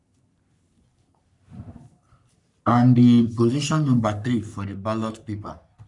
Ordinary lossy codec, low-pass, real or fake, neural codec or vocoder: none; 10.8 kHz; fake; codec, 44.1 kHz, 3.4 kbps, Pupu-Codec